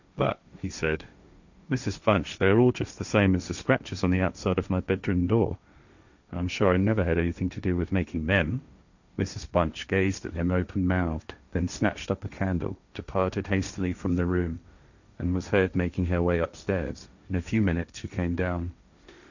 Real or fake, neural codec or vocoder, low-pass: fake; codec, 16 kHz, 1.1 kbps, Voila-Tokenizer; 7.2 kHz